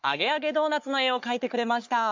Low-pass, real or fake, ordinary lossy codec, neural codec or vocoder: 7.2 kHz; fake; MP3, 64 kbps; codec, 44.1 kHz, 3.4 kbps, Pupu-Codec